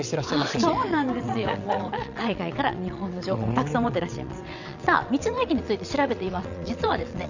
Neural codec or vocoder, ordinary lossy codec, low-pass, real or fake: vocoder, 22.05 kHz, 80 mel bands, WaveNeXt; none; 7.2 kHz; fake